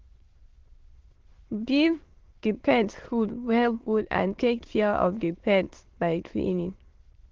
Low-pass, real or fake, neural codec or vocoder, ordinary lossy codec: 7.2 kHz; fake; autoencoder, 22.05 kHz, a latent of 192 numbers a frame, VITS, trained on many speakers; Opus, 16 kbps